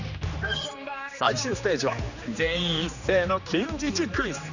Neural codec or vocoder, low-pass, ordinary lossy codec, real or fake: codec, 16 kHz, 2 kbps, X-Codec, HuBERT features, trained on general audio; 7.2 kHz; none; fake